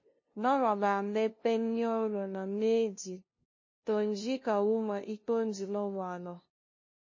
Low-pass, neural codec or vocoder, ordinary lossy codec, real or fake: 7.2 kHz; codec, 16 kHz, 0.5 kbps, FunCodec, trained on LibriTTS, 25 frames a second; MP3, 32 kbps; fake